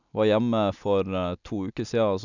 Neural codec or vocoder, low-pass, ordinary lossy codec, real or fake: none; 7.2 kHz; none; real